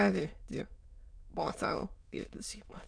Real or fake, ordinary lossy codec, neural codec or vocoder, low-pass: fake; none; autoencoder, 22.05 kHz, a latent of 192 numbers a frame, VITS, trained on many speakers; 9.9 kHz